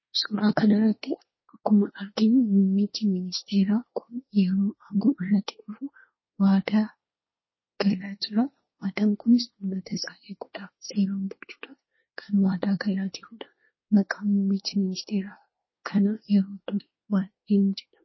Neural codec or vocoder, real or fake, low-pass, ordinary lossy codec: codec, 24 kHz, 1 kbps, SNAC; fake; 7.2 kHz; MP3, 24 kbps